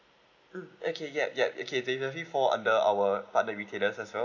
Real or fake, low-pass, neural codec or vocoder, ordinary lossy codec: real; 7.2 kHz; none; none